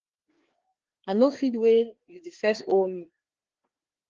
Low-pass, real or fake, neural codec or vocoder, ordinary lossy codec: 7.2 kHz; fake; codec, 16 kHz, 2 kbps, FreqCodec, larger model; Opus, 16 kbps